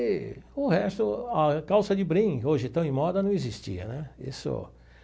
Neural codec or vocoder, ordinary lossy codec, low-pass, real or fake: none; none; none; real